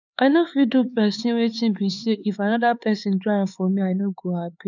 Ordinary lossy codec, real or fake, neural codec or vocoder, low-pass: none; fake; codec, 16 kHz, 4 kbps, X-Codec, HuBERT features, trained on LibriSpeech; 7.2 kHz